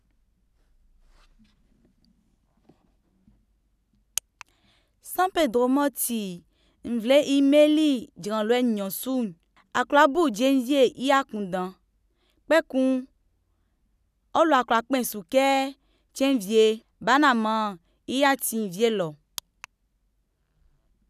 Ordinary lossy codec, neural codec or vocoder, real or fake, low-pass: none; none; real; 14.4 kHz